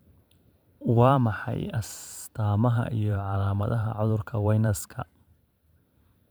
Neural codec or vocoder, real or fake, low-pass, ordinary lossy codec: vocoder, 44.1 kHz, 128 mel bands every 512 samples, BigVGAN v2; fake; none; none